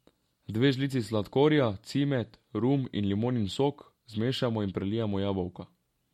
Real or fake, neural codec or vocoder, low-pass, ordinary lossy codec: real; none; 19.8 kHz; MP3, 64 kbps